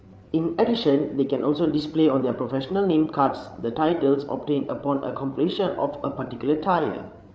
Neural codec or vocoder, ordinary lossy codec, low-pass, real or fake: codec, 16 kHz, 8 kbps, FreqCodec, larger model; none; none; fake